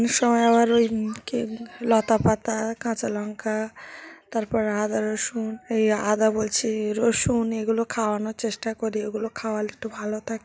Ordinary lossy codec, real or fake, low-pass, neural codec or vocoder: none; real; none; none